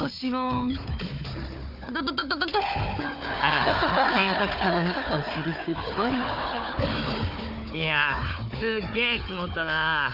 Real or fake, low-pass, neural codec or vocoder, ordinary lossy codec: fake; 5.4 kHz; codec, 16 kHz, 4 kbps, FunCodec, trained on Chinese and English, 50 frames a second; none